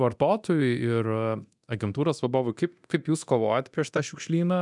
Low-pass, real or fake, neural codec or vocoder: 10.8 kHz; fake; codec, 24 kHz, 0.9 kbps, DualCodec